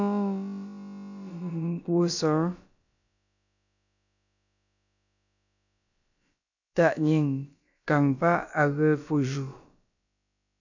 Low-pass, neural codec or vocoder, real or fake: 7.2 kHz; codec, 16 kHz, about 1 kbps, DyCAST, with the encoder's durations; fake